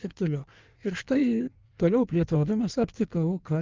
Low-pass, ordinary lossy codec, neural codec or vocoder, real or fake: 7.2 kHz; Opus, 24 kbps; codec, 16 kHz in and 24 kHz out, 1.1 kbps, FireRedTTS-2 codec; fake